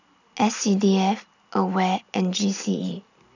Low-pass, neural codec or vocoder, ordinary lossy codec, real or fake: 7.2 kHz; none; none; real